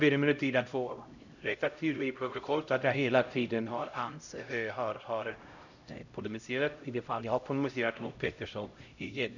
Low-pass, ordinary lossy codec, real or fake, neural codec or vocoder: 7.2 kHz; none; fake; codec, 16 kHz, 0.5 kbps, X-Codec, HuBERT features, trained on LibriSpeech